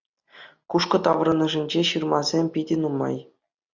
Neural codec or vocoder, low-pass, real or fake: none; 7.2 kHz; real